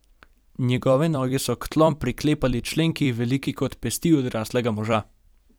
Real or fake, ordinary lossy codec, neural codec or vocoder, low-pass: fake; none; vocoder, 44.1 kHz, 128 mel bands every 256 samples, BigVGAN v2; none